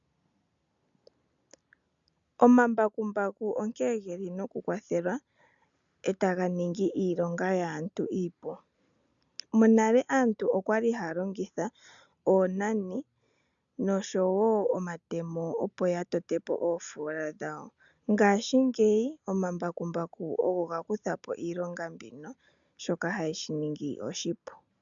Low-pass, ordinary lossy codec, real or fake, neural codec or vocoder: 7.2 kHz; MP3, 96 kbps; real; none